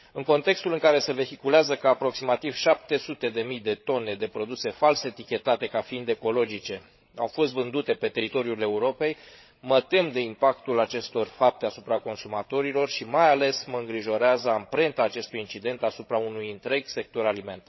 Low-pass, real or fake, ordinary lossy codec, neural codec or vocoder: 7.2 kHz; fake; MP3, 24 kbps; codec, 16 kHz, 16 kbps, FreqCodec, larger model